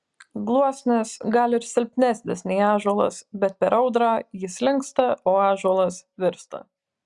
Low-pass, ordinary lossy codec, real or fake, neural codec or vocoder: 10.8 kHz; Opus, 64 kbps; real; none